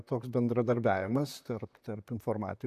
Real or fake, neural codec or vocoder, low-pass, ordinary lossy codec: fake; vocoder, 44.1 kHz, 128 mel bands, Pupu-Vocoder; 14.4 kHz; AAC, 96 kbps